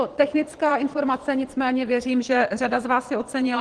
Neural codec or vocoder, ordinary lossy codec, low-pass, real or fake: vocoder, 22.05 kHz, 80 mel bands, Vocos; Opus, 16 kbps; 9.9 kHz; fake